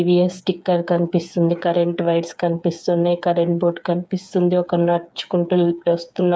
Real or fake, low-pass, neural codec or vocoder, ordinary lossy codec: fake; none; codec, 16 kHz, 8 kbps, FreqCodec, smaller model; none